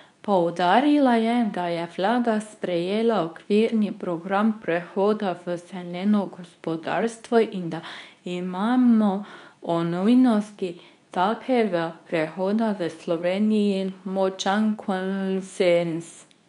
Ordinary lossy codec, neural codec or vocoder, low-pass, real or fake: none; codec, 24 kHz, 0.9 kbps, WavTokenizer, medium speech release version 2; 10.8 kHz; fake